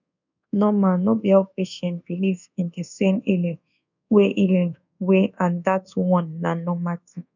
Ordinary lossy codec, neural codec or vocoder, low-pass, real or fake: none; codec, 24 kHz, 1.2 kbps, DualCodec; 7.2 kHz; fake